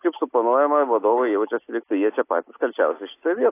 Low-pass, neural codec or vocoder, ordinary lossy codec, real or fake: 3.6 kHz; none; AAC, 24 kbps; real